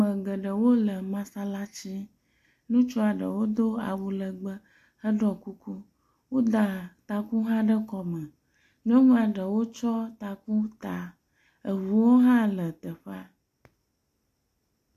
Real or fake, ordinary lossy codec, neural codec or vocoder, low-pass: real; AAC, 64 kbps; none; 14.4 kHz